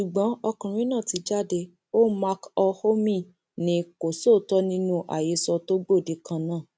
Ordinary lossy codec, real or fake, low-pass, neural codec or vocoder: none; real; none; none